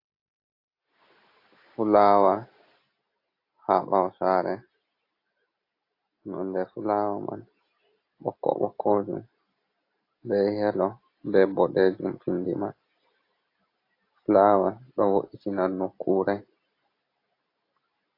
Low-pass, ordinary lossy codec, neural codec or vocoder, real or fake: 5.4 kHz; AAC, 32 kbps; none; real